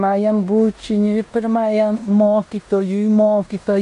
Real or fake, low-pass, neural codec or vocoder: fake; 10.8 kHz; codec, 16 kHz in and 24 kHz out, 0.9 kbps, LongCat-Audio-Codec, fine tuned four codebook decoder